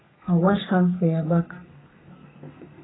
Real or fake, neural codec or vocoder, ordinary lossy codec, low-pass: fake; codec, 32 kHz, 1.9 kbps, SNAC; AAC, 16 kbps; 7.2 kHz